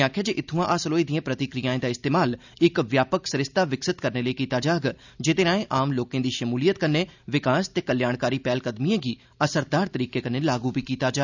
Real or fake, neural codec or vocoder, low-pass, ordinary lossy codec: real; none; 7.2 kHz; none